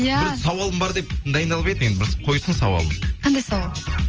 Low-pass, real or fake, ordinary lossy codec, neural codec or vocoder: 7.2 kHz; real; Opus, 24 kbps; none